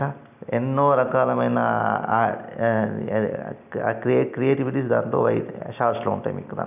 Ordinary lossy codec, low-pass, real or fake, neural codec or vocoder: none; 3.6 kHz; real; none